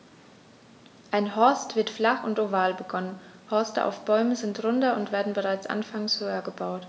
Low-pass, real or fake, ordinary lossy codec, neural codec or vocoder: none; real; none; none